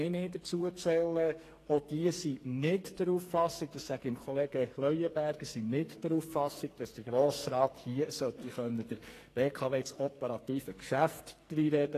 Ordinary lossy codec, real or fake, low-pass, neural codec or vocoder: AAC, 48 kbps; fake; 14.4 kHz; codec, 44.1 kHz, 2.6 kbps, SNAC